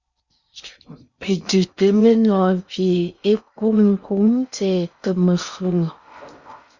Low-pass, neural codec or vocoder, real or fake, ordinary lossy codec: 7.2 kHz; codec, 16 kHz in and 24 kHz out, 0.6 kbps, FocalCodec, streaming, 4096 codes; fake; Opus, 64 kbps